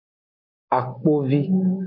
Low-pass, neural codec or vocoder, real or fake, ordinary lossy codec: 5.4 kHz; none; real; MP3, 48 kbps